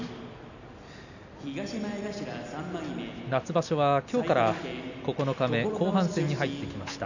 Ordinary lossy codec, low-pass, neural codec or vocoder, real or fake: none; 7.2 kHz; none; real